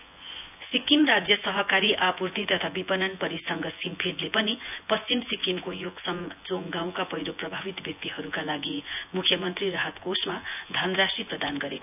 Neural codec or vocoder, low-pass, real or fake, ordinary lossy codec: vocoder, 24 kHz, 100 mel bands, Vocos; 3.6 kHz; fake; none